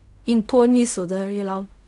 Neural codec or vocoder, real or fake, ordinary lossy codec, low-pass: codec, 16 kHz in and 24 kHz out, 0.4 kbps, LongCat-Audio-Codec, fine tuned four codebook decoder; fake; none; 10.8 kHz